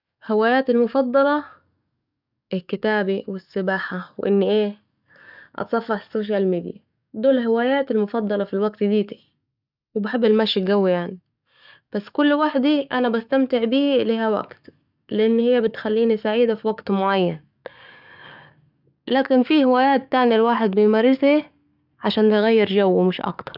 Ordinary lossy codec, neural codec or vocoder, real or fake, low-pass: none; codec, 44.1 kHz, 7.8 kbps, DAC; fake; 5.4 kHz